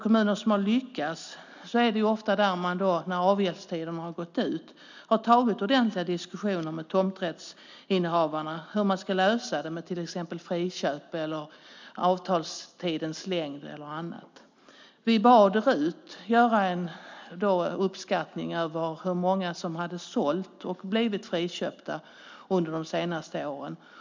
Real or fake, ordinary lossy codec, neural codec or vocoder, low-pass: real; MP3, 64 kbps; none; 7.2 kHz